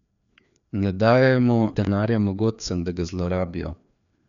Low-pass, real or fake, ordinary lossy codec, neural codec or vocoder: 7.2 kHz; fake; none; codec, 16 kHz, 2 kbps, FreqCodec, larger model